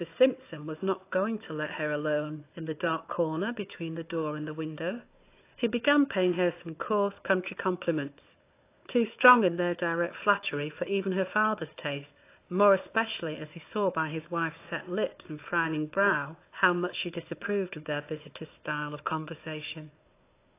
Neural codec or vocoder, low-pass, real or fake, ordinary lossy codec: codec, 16 kHz, 4 kbps, FunCodec, trained on Chinese and English, 50 frames a second; 3.6 kHz; fake; AAC, 24 kbps